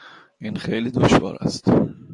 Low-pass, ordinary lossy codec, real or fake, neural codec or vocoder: 10.8 kHz; AAC, 48 kbps; real; none